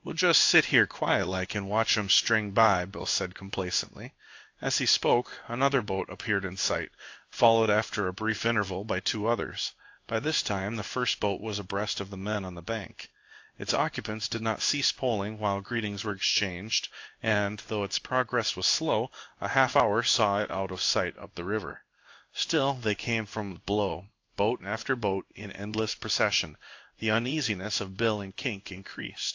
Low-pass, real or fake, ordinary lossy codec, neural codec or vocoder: 7.2 kHz; fake; AAC, 48 kbps; codec, 16 kHz in and 24 kHz out, 1 kbps, XY-Tokenizer